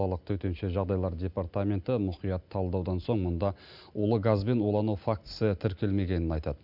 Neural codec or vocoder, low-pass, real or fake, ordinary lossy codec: none; 5.4 kHz; real; none